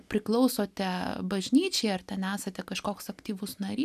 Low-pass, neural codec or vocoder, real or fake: 14.4 kHz; none; real